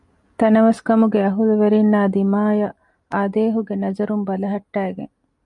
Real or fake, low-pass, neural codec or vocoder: real; 10.8 kHz; none